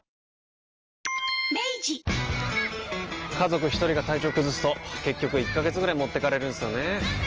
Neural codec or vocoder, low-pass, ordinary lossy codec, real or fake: none; 7.2 kHz; Opus, 24 kbps; real